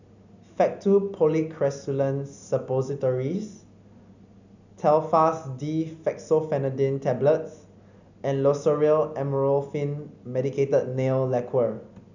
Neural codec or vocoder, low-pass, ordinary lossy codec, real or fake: none; 7.2 kHz; none; real